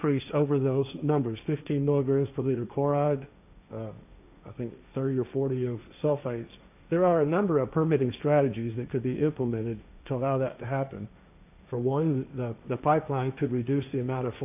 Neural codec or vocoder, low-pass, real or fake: codec, 16 kHz, 1.1 kbps, Voila-Tokenizer; 3.6 kHz; fake